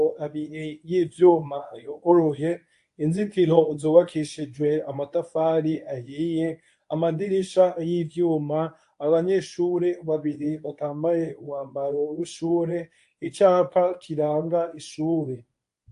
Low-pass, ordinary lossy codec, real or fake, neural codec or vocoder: 10.8 kHz; AAC, 64 kbps; fake; codec, 24 kHz, 0.9 kbps, WavTokenizer, medium speech release version 1